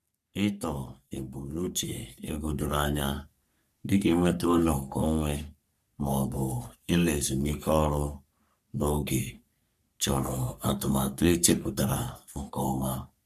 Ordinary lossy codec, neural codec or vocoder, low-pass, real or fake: none; codec, 44.1 kHz, 3.4 kbps, Pupu-Codec; 14.4 kHz; fake